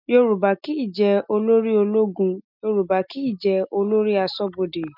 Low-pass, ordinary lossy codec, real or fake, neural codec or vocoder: 5.4 kHz; none; real; none